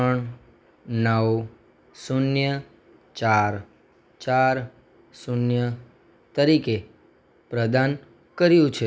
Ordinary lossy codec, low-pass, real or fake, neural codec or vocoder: none; none; real; none